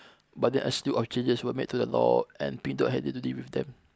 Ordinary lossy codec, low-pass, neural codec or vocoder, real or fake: none; none; none; real